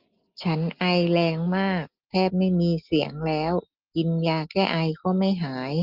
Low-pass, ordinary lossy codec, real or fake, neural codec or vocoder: 5.4 kHz; Opus, 32 kbps; real; none